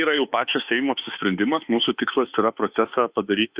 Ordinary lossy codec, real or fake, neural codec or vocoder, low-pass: Opus, 64 kbps; fake; autoencoder, 48 kHz, 32 numbers a frame, DAC-VAE, trained on Japanese speech; 3.6 kHz